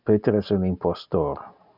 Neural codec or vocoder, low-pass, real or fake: none; 5.4 kHz; real